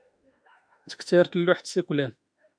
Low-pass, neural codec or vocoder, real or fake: 9.9 kHz; autoencoder, 48 kHz, 32 numbers a frame, DAC-VAE, trained on Japanese speech; fake